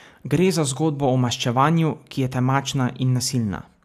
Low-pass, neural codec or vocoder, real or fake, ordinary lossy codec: 14.4 kHz; none; real; AAC, 96 kbps